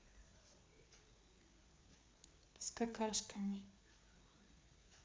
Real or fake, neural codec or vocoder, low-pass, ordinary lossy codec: fake; codec, 16 kHz, 4 kbps, FreqCodec, larger model; none; none